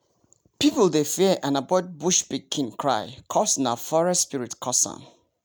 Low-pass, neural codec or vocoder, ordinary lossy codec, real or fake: none; none; none; real